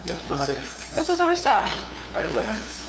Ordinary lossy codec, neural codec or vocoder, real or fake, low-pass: none; codec, 16 kHz, 2 kbps, FunCodec, trained on LibriTTS, 25 frames a second; fake; none